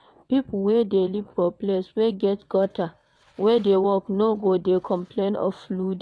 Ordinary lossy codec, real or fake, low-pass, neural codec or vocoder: none; fake; none; vocoder, 22.05 kHz, 80 mel bands, WaveNeXt